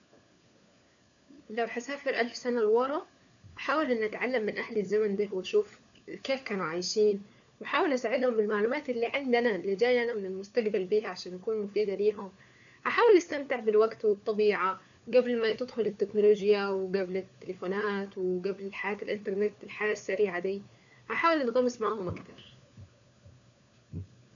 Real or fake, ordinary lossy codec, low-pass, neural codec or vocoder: fake; none; 7.2 kHz; codec, 16 kHz, 4 kbps, FunCodec, trained on LibriTTS, 50 frames a second